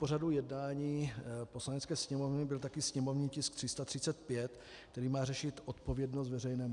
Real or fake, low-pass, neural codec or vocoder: real; 10.8 kHz; none